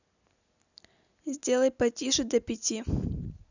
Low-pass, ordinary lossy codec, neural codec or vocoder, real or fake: 7.2 kHz; none; none; real